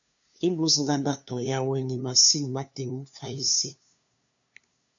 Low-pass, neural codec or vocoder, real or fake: 7.2 kHz; codec, 16 kHz, 2 kbps, FunCodec, trained on LibriTTS, 25 frames a second; fake